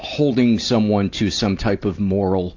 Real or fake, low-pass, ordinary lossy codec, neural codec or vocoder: real; 7.2 kHz; AAC, 48 kbps; none